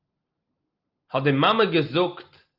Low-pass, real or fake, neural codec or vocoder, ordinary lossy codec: 5.4 kHz; real; none; Opus, 32 kbps